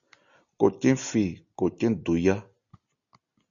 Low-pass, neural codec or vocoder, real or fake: 7.2 kHz; none; real